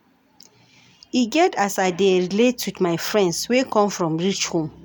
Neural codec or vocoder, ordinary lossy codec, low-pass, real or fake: none; none; none; real